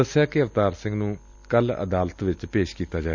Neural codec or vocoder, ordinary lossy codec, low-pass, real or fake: none; none; 7.2 kHz; real